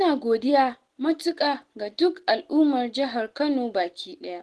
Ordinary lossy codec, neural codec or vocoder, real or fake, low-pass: Opus, 16 kbps; none; real; 10.8 kHz